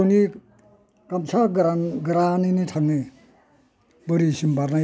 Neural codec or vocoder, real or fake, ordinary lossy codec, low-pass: none; real; none; none